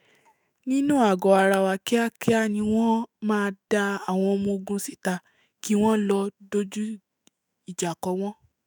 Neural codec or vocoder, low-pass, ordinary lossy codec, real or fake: autoencoder, 48 kHz, 128 numbers a frame, DAC-VAE, trained on Japanese speech; none; none; fake